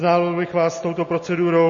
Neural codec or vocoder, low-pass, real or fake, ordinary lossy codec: none; 7.2 kHz; real; MP3, 32 kbps